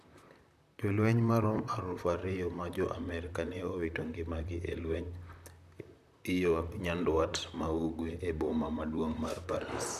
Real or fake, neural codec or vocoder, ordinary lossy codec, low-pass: fake; vocoder, 44.1 kHz, 128 mel bands, Pupu-Vocoder; none; 14.4 kHz